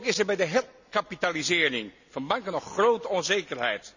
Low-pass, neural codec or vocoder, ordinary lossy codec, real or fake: 7.2 kHz; none; none; real